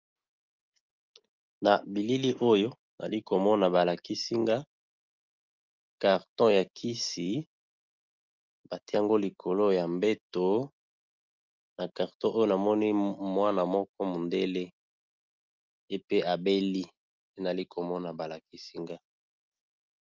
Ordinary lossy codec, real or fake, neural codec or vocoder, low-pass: Opus, 24 kbps; real; none; 7.2 kHz